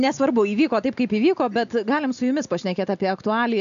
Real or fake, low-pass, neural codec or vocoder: real; 7.2 kHz; none